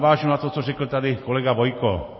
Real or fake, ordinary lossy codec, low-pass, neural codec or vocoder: real; MP3, 24 kbps; 7.2 kHz; none